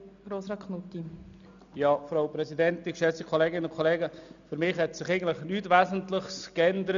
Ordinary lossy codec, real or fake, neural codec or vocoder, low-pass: none; real; none; 7.2 kHz